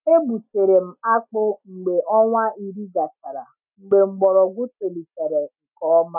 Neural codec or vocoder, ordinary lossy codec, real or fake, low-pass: none; none; real; 3.6 kHz